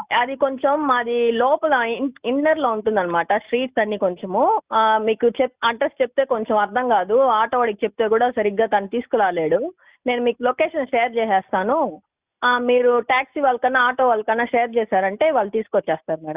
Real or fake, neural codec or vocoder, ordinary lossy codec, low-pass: real; none; Opus, 64 kbps; 3.6 kHz